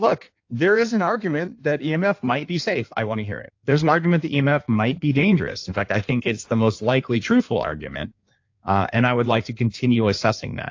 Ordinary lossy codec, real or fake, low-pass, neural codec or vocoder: AAC, 48 kbps; fake; 7.2 kHz; codec, 16 kHz in and 24 kHz out, 1.1 kbps, FireRedTTS-2 codec